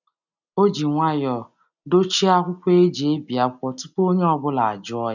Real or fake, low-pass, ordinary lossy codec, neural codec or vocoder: real; 7.2 kHz; none; none